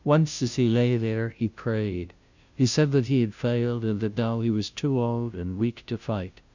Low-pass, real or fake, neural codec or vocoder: 7.2 kHz; fake; codec, 16 kHz, 0.5 kbps, FunCodec, trained on Chinese and English, 25 frames a second